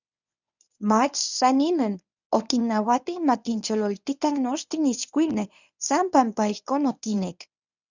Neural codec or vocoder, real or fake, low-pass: codec, 24 kHz, 0.9 kbps, WavTokenizer, medium speech release version 1; fake; 7.2 kHz